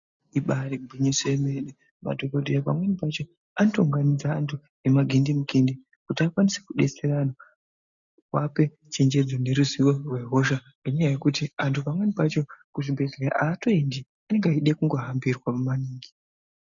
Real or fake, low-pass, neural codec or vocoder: real; 7.2 kHz; none